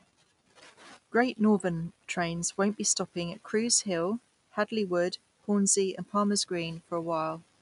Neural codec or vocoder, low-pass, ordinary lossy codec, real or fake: none; 10.8 kHz; none; real